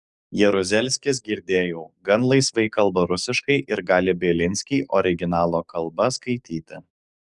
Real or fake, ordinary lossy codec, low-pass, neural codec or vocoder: fake; Opus, 64 kbps; 10.8 kHz; codec, 44.1 kHz, 7.8 kbps, DAC